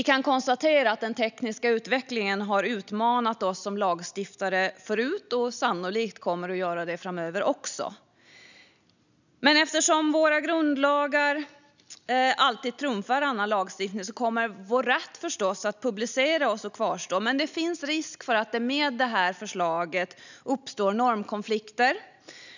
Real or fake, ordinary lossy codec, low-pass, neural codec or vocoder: real; none; 7.2 kHz; none